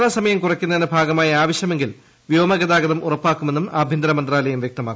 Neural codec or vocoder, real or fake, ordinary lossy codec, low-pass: none; real; none; none